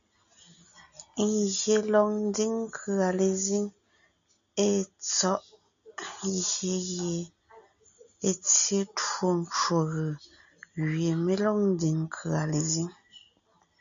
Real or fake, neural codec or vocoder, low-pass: real; none; 7.2 kHz